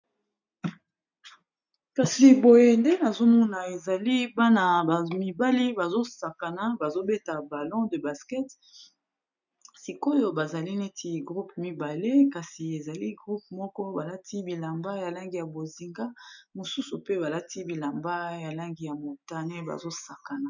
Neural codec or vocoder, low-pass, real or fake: none; 7.2 kHz; real